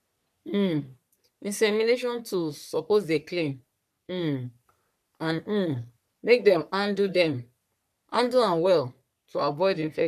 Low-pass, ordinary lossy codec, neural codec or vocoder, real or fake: 14.4 kHz; none; codec, 44.1 kHz, 3.4 kbps, Pupu-Codec; fake